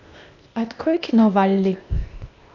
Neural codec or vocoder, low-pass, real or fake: codec, 16 kHz, 0.8 kbps, ZipCodec; 7.2 kHz; fake